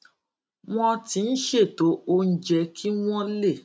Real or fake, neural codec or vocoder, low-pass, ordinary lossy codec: real; none; none; none